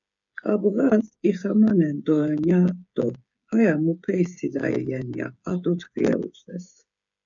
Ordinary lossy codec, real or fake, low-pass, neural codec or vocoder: MP3, 96 kbps; fake; 7.2 kHz; codec, 16 kHz, 8 kbps, FreqCodec, smaller model